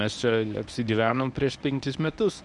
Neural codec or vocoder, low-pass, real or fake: codec, 24 kHz, 0.9 kbps, WavTokenizer, medium speech release version 1; 10.8 kHz; fake